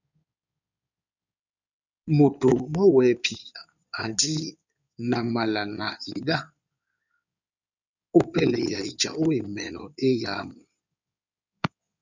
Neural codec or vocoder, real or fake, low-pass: codec, 16 kHz in and 24 kHz out, 2.2 kbps, FireRedTTS-2 codec; fake; 7.2 kHz